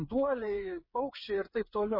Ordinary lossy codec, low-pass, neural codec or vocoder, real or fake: MP3, 24 kbps; 5.4 kHz; codec, 16 kHz, 8 kbps, FreqCodec, smaller model; fake